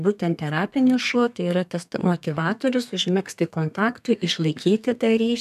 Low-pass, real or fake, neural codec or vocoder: 14.4 kHz; fake; codec, 32 kHz, 1.9 kbps, SNAC